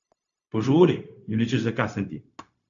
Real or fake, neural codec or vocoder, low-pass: fake; codec, 16 kHz, 0.4 kbps, LongCat-Audio-Codec; 7.2 kHz